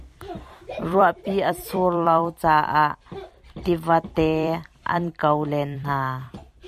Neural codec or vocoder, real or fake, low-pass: vocoder, 44.1 kHz, 128 mel bands every 256 samples, BigVGAN v2; fake; 14.4 kHz